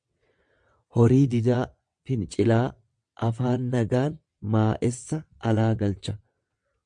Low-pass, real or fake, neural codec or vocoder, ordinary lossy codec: 9.9 kHz; fake; vocoder, 22.05 kHz, 80 mel bands, WaveNeXt; MP3, 64 kbps